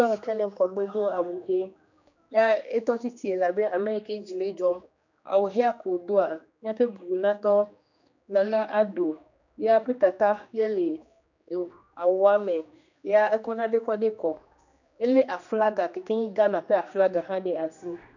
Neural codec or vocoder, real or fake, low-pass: codec, 16 kHz, 2 kbps, X-Codec, HuBERT features, trained on general audio; fake; 7.2 kHz